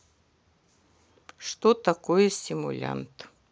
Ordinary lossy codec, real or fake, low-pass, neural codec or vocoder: none; real; none; none